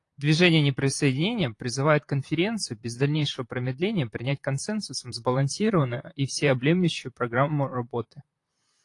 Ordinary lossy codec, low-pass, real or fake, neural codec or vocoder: AAC, 48 kbps; 10.8 kHz; fake; vocoder, 44.1 kHz, 128 mel bands, Pupu-Vocoder